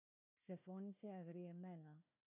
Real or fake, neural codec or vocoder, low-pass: fake; codec, 16 kHz, 1 kbps, FunCodec, trained on Chinese and English, 50 frames a second; 3.6 kHz